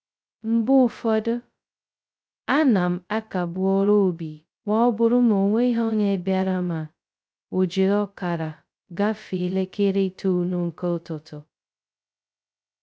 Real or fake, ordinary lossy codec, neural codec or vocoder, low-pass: fake; none; codec, 16 kHz, 0.2 kbps, FocalCodec; none